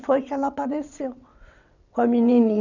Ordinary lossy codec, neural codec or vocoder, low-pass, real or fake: none; vocoder, 22.05 kHz, 80 mel bands, WaveNeXt; 7.2 kHz; fake